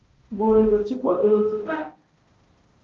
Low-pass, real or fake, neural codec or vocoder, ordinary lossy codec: 7.2 kHz; fake; codec, 16 kHz, 0.5 kbps, X-Codec, HuBERT features, trained on balanced general audio; Opus, 24 kbps